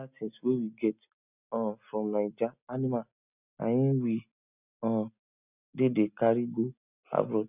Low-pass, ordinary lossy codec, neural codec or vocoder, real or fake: 3.6 kHz; none; none; real